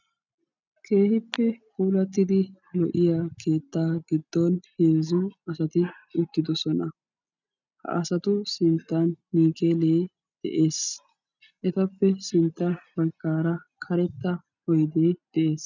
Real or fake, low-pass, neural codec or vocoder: real; 7.2 kHz; none